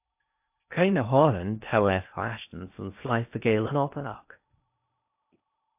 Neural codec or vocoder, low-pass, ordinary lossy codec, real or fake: codec, 16 kHz in and 24 kHz out, 0.6 kbps, FocalCodec, streaming, 2048 codes; 3.6 kHz; AAC, 32 kbps; fake